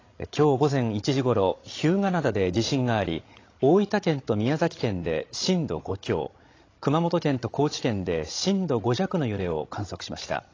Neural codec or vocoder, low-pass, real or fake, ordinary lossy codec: codec, 16 kHz, 16 kbps, FreqCodec, larger model; 7.2 kHz; fake; AAC, 32 kbps